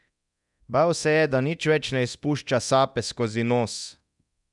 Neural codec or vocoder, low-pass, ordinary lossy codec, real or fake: codec, 24 kHz, 0.9 kbps, DualCodec; 10.8 kHz; none; fake